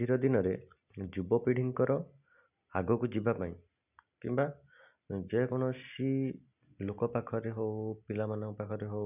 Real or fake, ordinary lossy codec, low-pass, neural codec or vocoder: real; none; 3.6 kHz; none